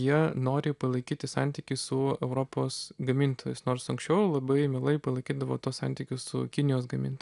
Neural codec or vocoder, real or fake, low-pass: none; real; 10.8 kHz